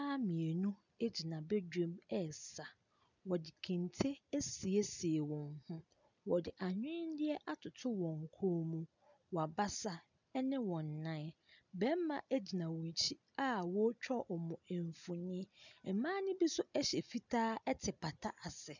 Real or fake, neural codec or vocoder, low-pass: real; none; 7.2 kHz